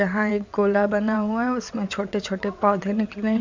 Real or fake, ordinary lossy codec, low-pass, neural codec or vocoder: fake; MP3, 64 kbps; 7.2 kHz; vocoder, 22.05 kHz, 80 mel bands, WaveNeXt